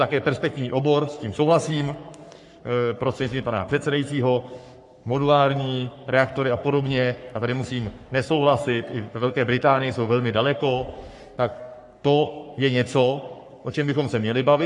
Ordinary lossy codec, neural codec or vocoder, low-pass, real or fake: AAC, 64 kbps; codec, 44.1 kHz, 3.4 kbps, Pupu-Codec; 10.8 kHz; fake